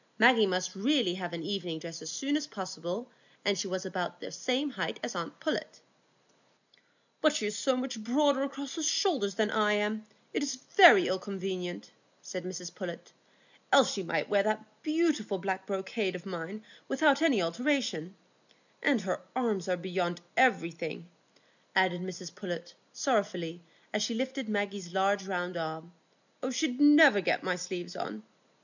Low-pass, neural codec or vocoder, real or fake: 7.2 kHz; none; real